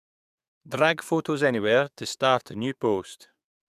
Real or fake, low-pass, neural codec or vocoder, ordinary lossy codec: fake; 14.4 kHz; codec, 44.1 kHz, 7.8 kbps, DAC; none